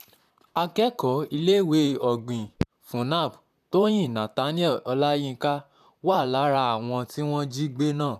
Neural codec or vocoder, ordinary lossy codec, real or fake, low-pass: vocoder, 44.1 kHz, 128 mel bands, Pupu-Vocoder; none; fake; 14.4 kHz